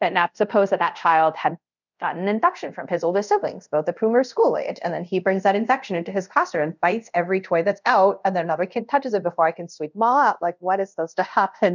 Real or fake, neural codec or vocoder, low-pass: fake; codec, 24 kHz, 0.5 kbps, DualCodec; 7.2 kHz